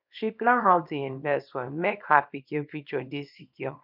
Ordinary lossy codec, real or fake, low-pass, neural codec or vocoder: none; fake; 5.4 kHz; codec, 24 kHz, 0.9 kbps, WavTokenizer, small release